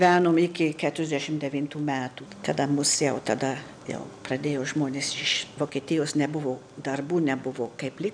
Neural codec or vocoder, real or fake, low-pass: none; real; 9.9 kHz